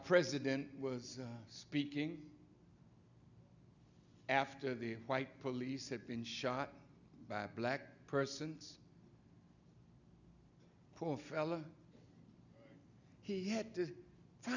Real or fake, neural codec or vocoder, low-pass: real; none; 7.2 kHz